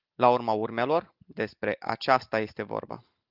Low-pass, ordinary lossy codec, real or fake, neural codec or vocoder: 5.4 kHz; Opus, 24 kbps; real; none